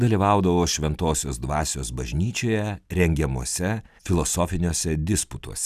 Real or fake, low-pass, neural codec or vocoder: real; 14.4 kHz; none